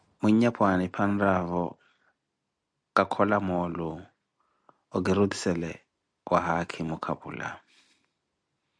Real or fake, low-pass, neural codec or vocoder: real; 9.9 kHz; none